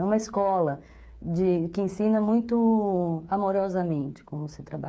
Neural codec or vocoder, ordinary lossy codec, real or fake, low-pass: codec, 16 kHz, 8 kbps, FreqCodec, smaller model; none; fake; none